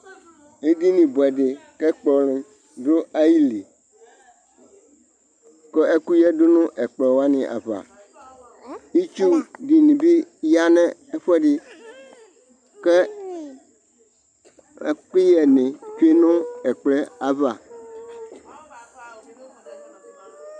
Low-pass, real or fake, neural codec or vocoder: 9.9 kHz; real; none